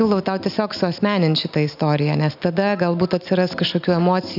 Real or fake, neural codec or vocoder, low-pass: real; none; 5.4 kHz